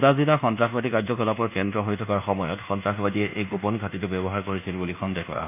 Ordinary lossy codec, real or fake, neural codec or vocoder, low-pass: none; fake; codec, 24 kHz, 1.2 kbps, DualCodec; 3.6 kHz